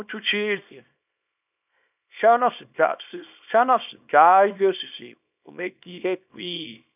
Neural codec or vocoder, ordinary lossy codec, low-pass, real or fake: codec, 24 kHz, 0.9 kbps, WavTokenizer, small release; none; 3.6 kHz; fake